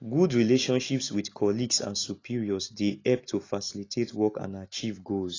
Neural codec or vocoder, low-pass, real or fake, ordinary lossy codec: none; 7.2 kHz; real; AAC, 32 kbps